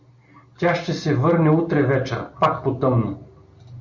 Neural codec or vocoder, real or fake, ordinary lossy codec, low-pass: none; real; MP3, 48 kbps; 7.2 kHz